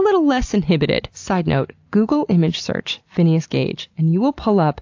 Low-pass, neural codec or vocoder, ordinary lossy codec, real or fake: 7.2 kHz; none; AAC, 48 kbps; real